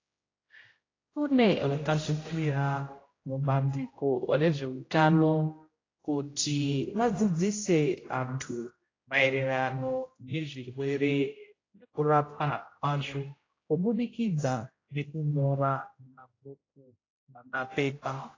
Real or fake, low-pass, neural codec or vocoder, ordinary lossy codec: fake; 7.2 kHz; codec, 16 kHz, 0.5 kbps, X-Codec, HuBERT features, trained on general audio; AAC, 32 kbps